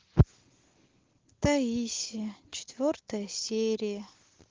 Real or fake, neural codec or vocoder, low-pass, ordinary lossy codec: real; none; 7.2 kHz; Opus, 16 kbps